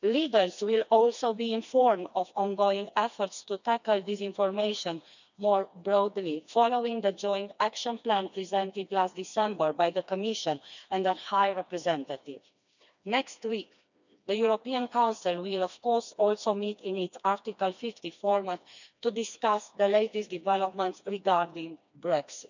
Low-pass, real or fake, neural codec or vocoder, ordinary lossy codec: 7.2 kHz; fake; codec, 16 kHz, 2 kbps, FreqCodec, smaller model; none